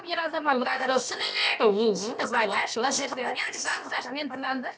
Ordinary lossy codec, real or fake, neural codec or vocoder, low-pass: none; fake; codec, 16 kHz, about 1 kbps, DyCAST, with the encoder's durations; none